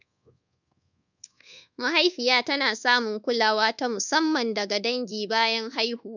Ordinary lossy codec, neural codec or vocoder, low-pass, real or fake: none; codec, 24 kHz, 1.2 kbps, DualCodec; 7.2 kHz; fake